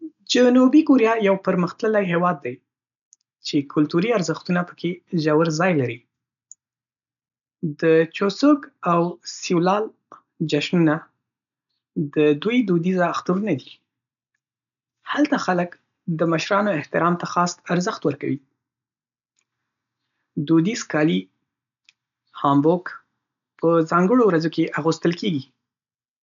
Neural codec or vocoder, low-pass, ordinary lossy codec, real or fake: none; 7.2 kHz; none; real